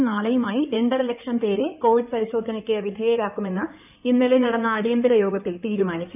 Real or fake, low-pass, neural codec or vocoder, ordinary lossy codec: fake; 3.6 kHz; codec, 16 kHz in and 24 kHz out, 2.2 kbps, FireRedTTS-2 codec; none